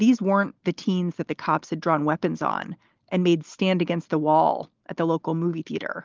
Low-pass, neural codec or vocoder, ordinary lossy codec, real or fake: 7.2 kHz; none; Opus, 32 kbps; real